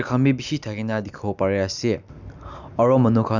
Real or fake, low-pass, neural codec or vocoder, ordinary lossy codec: real; 7.2 kHz; none; none